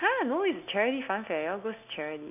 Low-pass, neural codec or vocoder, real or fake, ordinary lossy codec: 3.6 kHz; none; real; none